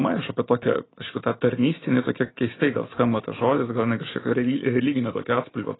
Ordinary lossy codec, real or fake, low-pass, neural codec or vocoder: AAC, 16 kbps; real; 7.2 kHz; none